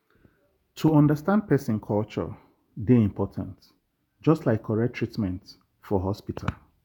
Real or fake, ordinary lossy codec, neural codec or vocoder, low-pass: fake; none; vocoder, 48 kHz, 128 mel bands, Vocos; 19.8 kHz